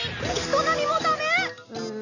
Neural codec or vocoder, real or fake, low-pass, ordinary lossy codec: none; real; 7.2 kHz; none